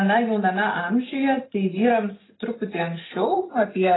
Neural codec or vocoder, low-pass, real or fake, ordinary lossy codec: none; 7.2 kHz; real; AAC, 16 kbps